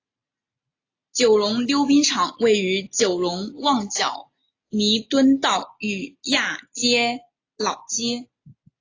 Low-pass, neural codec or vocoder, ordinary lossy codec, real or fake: 7.2 kHz; none; AAC, 32 kbps; real